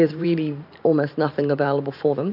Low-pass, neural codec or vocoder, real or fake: 5.4 kHz; vocoder, 44.1 kHz, 128 mel bands every 512 samples, BigVGAN v2; fake